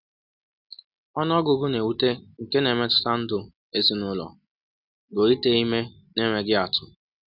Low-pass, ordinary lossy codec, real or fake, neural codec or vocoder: 5.4 kHz; none; real; none